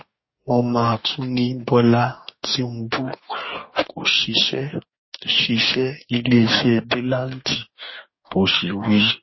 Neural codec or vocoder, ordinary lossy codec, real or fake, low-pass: codec, 44.1 kHz, 2.6 kbps, DAC; MP3, 24 kbps; fake; 7.2 kHz